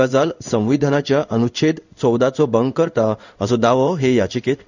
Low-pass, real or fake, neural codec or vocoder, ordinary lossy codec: 7.2 kHz; fake; codec, 16 kHz in and 24 kHz out, 1 kbps, XY-Tokenizer; none